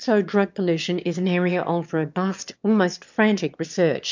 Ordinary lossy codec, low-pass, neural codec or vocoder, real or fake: MP3, 64 kbps; 7.2 kHz; autoencoder, 22.05 kHz, a latent of 192 numbers a frame, VITS, trained on one speaker; fake